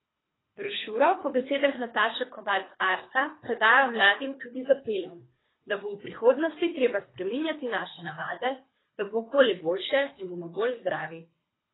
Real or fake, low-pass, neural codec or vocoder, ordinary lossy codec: fake; 7.2 kHz; codec, 24 kHz, 3 kbps, HILCodec; AAC, 16 kbps